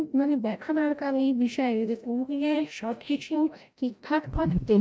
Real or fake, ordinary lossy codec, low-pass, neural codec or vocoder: fake; none; none; codec, 16 kHz, 0.5 kbps, FreqCodec, larger model